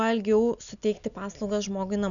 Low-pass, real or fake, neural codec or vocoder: 7.2 kHz; real; none